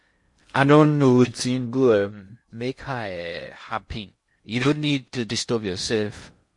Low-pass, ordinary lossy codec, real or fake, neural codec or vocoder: 10.8 kHz; MP3, 48 kbps; fake; codec, 16 kHz in and 24 kHz out, 0.6 kbps, FocalCodec, streaming, 2048 codes